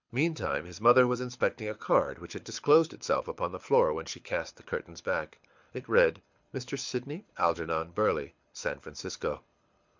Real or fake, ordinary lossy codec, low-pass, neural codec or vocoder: fake; MP3, 64 kbps; 7.2 kHz; codec, 24 kHz, 6 kbps, HILCodec